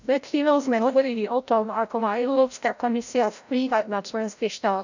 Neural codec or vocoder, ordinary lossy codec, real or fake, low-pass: codec, 16 kHz, 0.5 kbps, FreqCodec, larger model; none; fake; 7.2 kHz